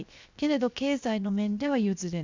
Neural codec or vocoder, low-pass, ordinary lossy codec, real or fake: codec, 16 kHz, 0.7 kbps, FocalCodec; 7.2 kHz; MP3, 64 kbps; fake